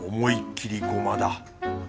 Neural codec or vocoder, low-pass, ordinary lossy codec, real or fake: none; none; none; real